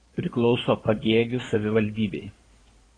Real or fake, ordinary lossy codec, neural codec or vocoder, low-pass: fake; AAC, 32 kbps; codec, 16 kHz in and 24 kHz out, 2.2 kbps, FireRedTTS-2 codec; 9.9 kHz